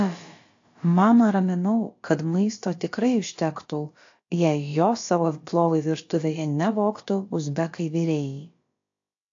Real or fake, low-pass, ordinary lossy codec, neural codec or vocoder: fake; 7.2 kHz; AAC, 48 kbps; codec, 16 kHz, about 1 kbps, DyCAST, with the encoder's durations